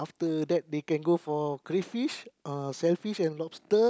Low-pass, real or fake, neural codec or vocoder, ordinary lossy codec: none; real; none; none